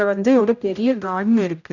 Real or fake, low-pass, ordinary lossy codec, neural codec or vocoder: fake; 7.2 kHz; none; codec, 16 kHz, 0.5 kbps, X-Codec, HuBERT features, trained on general audio